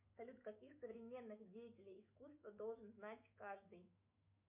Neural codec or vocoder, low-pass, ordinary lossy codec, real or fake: autoencoder, 48 kHz, 128 numbers a frame, DAC-VAE, trained on Japanese speech; 3.6 kHz; MP3, 32 kbps; fake